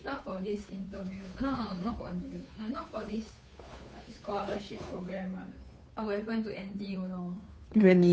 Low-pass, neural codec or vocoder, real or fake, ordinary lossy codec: none; codec, 16 kHz, 2 kbps, FunCodec, trained on Chinese and English, 25 frames a second; fake; none